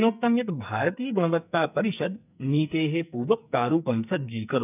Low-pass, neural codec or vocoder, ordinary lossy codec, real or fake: 3.6 kHz; codec, 44.1 kHz, 2.6 kbps, SNAC; none; fake